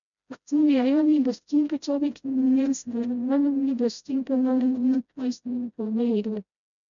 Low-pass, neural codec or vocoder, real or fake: 7.2 kHz; codec, 16 kHz, 0.5 kbps, FreqCodec, smaller model; fake